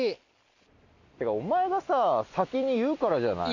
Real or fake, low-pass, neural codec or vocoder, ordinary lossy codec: real; 7.2 kHz; none; none